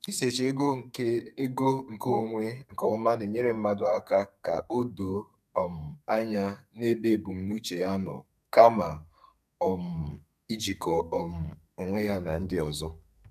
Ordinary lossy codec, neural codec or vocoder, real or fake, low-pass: none; codec, 32 kHz, 1.9 kbps, SNAC; fake; 14.4 kHz